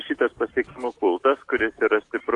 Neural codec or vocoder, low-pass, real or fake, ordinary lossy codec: none; 10.8 kHz; real; AAC, 48 kbps